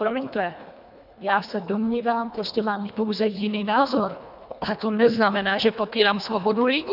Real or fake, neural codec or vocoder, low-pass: fake; codec, 24 kHz, 1.5 kbps, HILCodec; 5.4 kHz